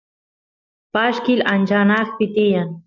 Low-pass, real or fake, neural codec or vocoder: 7.2 kHz; real; none